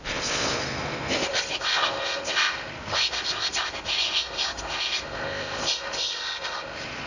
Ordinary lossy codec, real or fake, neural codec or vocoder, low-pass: none; fake; codec, 16 kHz in and 24 kHz out, 0.6 kbps, FocalCodec, streaming, 2048 codes; 7.2 kHz